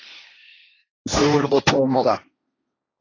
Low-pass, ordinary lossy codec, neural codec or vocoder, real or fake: 7.2 kHz; AAC, 32 kbps; codec, 16 kHz, 1.1 kbps, Voila-Tokenizer; fake